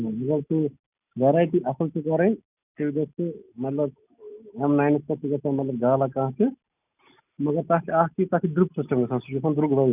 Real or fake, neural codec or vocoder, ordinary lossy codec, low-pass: real; none; none; 3.6 kHz